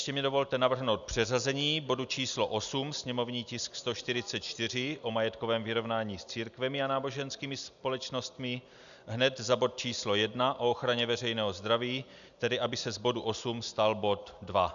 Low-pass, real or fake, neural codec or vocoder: 7.2 kHz; real; none